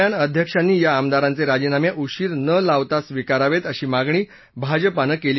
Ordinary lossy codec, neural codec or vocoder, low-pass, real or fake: MP3, 24 kbps; none; 7.2 kHz; real